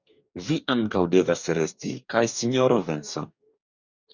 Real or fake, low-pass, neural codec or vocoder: fake; 7.2 kHz; codec, 44.1 kHz, 2.6 kbps, DAC